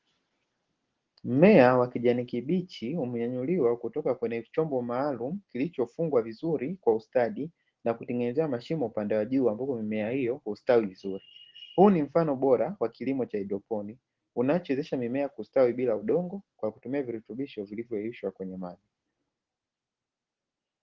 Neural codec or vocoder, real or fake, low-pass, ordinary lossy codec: none; real; 7.2 kHz; Opus, 16 kbps